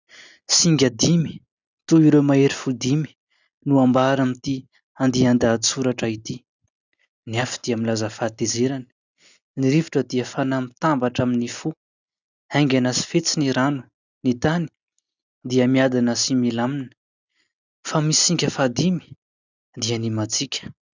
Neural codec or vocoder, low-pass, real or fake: none; 7.2 kHz; real